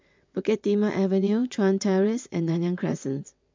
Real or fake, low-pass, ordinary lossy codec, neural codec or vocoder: fake; 7.2 kHz; MP3, 64 kbps; vocoder, 22.05 kHz, 80 mel bands, WaveNeXt